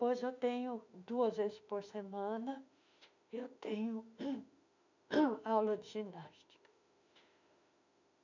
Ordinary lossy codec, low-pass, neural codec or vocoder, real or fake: none; 7.2 kHz; autoencoder, 48 kHz, 32 numbers a frame, DAC-VAE, trained on Japanese speech; fake